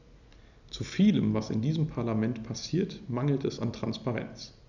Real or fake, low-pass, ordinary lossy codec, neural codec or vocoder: real; 7.2 kHz; none; none